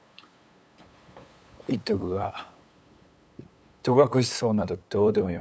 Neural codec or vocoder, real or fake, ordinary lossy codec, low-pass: codec, 16 kHz, 8 kbps, FunCodec, trained on LibriTTS, 25 frames a second; fake; none; none